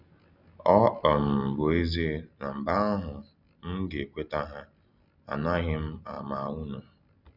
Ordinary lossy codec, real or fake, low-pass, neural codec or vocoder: none; real; 5.4 kHz; none